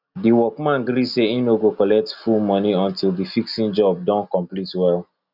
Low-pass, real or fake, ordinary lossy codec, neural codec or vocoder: 5.4 kHz; real; none; none